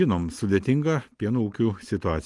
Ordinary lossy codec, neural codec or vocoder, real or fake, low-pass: Opus, 24 kbps; none; real; 10.8 kHz